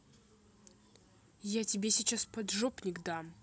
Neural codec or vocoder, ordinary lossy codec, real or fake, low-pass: none; none; real; none